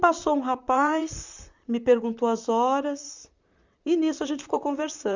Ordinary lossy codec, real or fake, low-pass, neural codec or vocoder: Opus, 64 kbps; fake; 7.2 kHz; vocoder, 44.1 kHz, 128 mel bands, Pupu-Vocoder